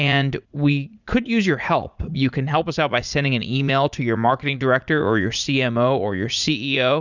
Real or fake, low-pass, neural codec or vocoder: fake; 7.2 kHz; vocoder, 44.1 kHz, 80 mel bands, Vocos